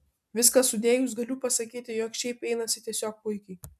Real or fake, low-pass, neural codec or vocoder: real; 14.4 kHz; none